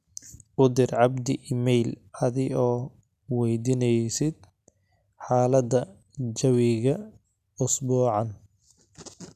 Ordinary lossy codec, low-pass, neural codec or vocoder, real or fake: none; 14.4 kHz; none; real